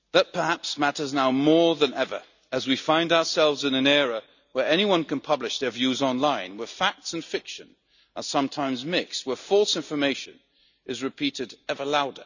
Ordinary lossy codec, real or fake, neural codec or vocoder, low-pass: none; real; none; 7.2 kHz